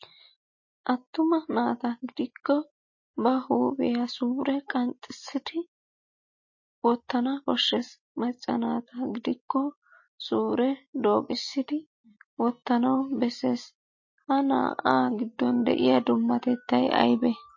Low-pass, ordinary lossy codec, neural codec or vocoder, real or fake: 7.2 kHz; MP3, 32 kbps; none; real